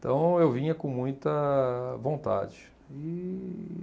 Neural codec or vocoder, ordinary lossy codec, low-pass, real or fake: none; none; none; real